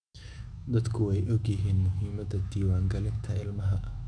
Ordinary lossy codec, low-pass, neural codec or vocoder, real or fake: none; 9.9 kHz; autoencoder, 48 kHz, 128 numbers a frame, DAC-VAE, trained on Japanese speech; fake